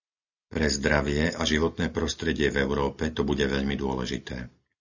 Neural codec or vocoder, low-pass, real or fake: none; 7.2 kHz; real